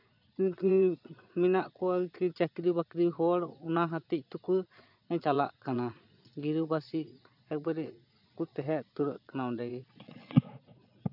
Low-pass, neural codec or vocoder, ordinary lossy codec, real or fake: 5.4 kHz; vocoder, 22.05 kHz, 80 mel bands, Vocos; none; fake